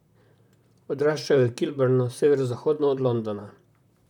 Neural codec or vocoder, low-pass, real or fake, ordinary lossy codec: vocoder, 44.1 kHz, 128 mel bands, Pupu-Vocoder; 19.8 kHz; fake; none